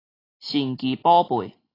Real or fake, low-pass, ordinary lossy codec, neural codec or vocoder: real; 5.4 kHz; AAC, 24 kbps; none